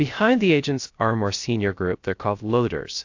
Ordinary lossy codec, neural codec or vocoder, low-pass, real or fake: AAC, 48 kbps; codec, 16 kHz, 0.3 kbps, FocalCodec; 7.2 kHz; fake